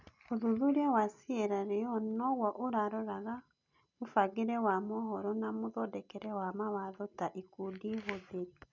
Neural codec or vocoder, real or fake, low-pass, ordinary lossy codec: none; real; 7.2 kHz; none